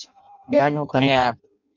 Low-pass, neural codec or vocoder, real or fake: 7.2 kHz; codec, 16 kHz in and 24 kHz out, 0.6 kbps, FireRedTTS-2 codec; fake